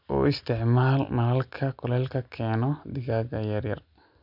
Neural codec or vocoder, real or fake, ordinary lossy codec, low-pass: none; real; none; 5.4 kHz